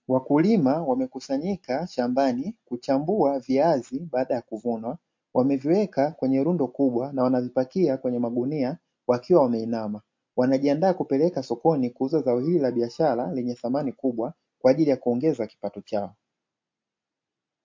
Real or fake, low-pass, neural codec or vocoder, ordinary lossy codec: real; 7.2 kHz; none; MP3, 48 kbps